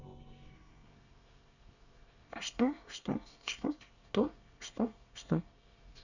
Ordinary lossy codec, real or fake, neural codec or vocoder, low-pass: none; fake; codec, 24 kHz, 1 kbps, SNAC; 7.2 kHz